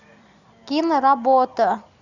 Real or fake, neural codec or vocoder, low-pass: real; none; 7.2 kHz